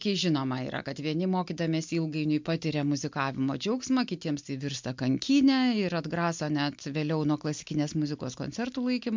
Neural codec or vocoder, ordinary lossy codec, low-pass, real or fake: none; MP3, 64 kbps; 7.2 kHz; real